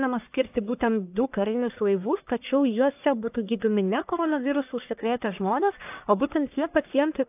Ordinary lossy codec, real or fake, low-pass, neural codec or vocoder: AAC, 32 kbps; fake; 3.6 kHz; codec, 44.1 kHz, 1.7 kbps, Pupu-Codec